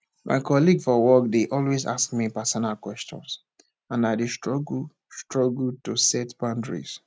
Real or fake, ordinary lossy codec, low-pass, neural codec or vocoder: real; none; none; none